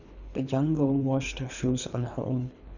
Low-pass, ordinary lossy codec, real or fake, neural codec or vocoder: 7.2 kHz; none; fake; codec, 24 kHz, 3 kbps, HILCodec